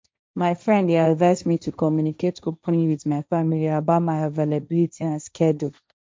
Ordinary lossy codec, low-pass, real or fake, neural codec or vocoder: none; none; fake; codec, 16 kHz, 1.1 kbps, Voila-Tokenizer